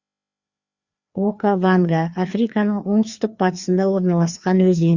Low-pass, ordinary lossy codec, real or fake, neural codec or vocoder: 7.2 kHz; Opus, 64 kbps; fake; codec, 16 kHz, 2 kbps, FreqCodec, larger model